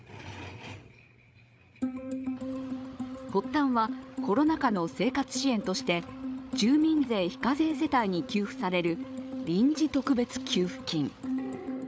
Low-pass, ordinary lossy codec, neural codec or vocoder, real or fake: none; none; codec, 16 kHz, 8 kbps, FreqCodec, larger model; fake